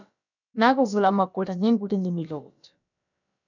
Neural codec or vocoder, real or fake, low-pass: codec, 16 kHz, about 1 kbps, DyCAST, with the encoder's durations; fake; 7.2 kHz